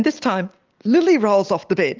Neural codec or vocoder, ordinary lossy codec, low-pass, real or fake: none; Opus, 32 kbps; 7.2 kHz; real